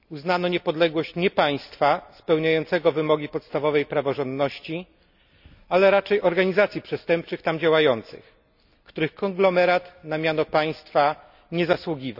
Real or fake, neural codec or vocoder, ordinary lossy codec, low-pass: real; none; none; 5.4 kHz